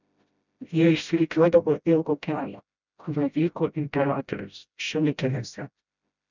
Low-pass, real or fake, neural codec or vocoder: 7.2 kHz; fake; codec, 16 kHz, 0.5 kbps, FreqCodec, smaller model